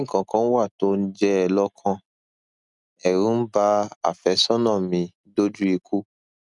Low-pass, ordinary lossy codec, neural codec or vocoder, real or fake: 10.8 kHz; none; none; real